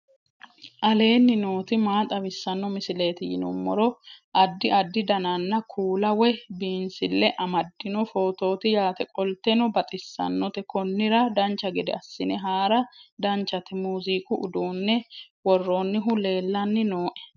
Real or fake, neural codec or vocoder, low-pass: real; none; 7.2 kHz